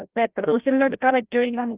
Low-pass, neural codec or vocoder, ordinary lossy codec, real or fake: 3.6 kHz; codec, 16 kHz, 0.5 kbps, FreqCodec, larger model; Opus, 32 kbps; fake